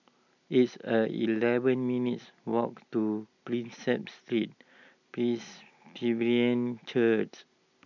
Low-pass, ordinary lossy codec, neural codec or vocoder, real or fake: 7.2 kHz; none; none; real